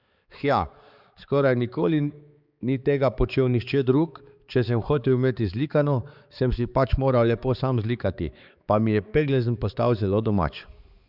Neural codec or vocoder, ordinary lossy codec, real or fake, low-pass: codec, 16 kHz, 4 kbps, X-Codec, HuBERT features, trained on balanced general audio; Opus, 64 kbps; fake; 5.4 kHz